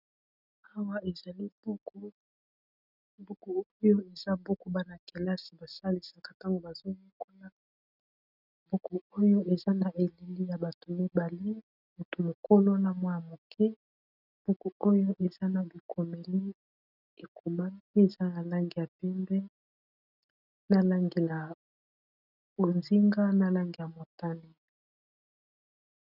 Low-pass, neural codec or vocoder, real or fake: 5.4 kHz; none; real